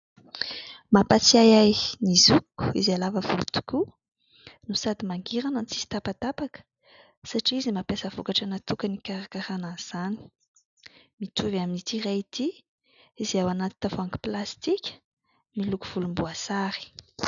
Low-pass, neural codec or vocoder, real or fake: 7.2 kHz; none; real